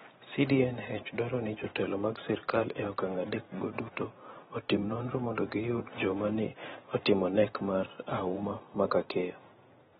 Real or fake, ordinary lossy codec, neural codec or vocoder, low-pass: fake; AAC, 16 kbps; vocoder, 44.1 kHz, 128 mel bands every 512 samples, BigVGAN v2; 19.8 kHz